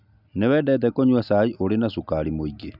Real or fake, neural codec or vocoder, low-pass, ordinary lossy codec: real; none; 5.4 kHz; none